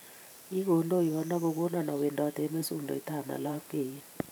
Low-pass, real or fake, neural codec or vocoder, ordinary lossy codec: none; fake; vocoder, 44.1 kHz, 128 mel bands every 512 samples, BigVGAN v2; none